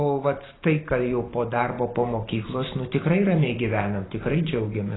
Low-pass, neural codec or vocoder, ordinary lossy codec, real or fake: 7.2 kHz; vocoder, 44.1 kHz, 128 mel bands every 512 samples, BigVGAN v2; AAC, 16 kbps; fake